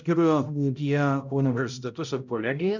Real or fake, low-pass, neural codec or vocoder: fake; 7.2 kHz; codec, 16 kHz, 0.5 kbps, X-Codec, HuBERT features, trained on balanced general audio